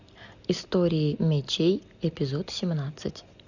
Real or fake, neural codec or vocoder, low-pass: real; none; 7.2 kHz